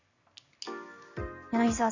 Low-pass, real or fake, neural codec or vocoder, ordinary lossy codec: 7.2 kHz; real; none; none